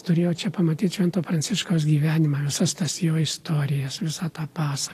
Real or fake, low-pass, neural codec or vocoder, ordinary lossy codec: real; 14.4 kHz; none; AAC, 48 kbps